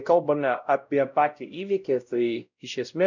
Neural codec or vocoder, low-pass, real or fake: codec, 16 kHz, 0.5 kbps, X-Codec, WavLM features, trained on Multilingual LibriSpeech; 7.2 kHz; fake